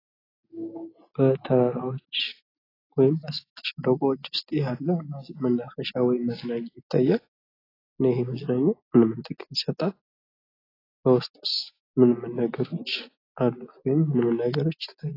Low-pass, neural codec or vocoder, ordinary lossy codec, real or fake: 5.4 kHz; none; AAC, 24 kbps; real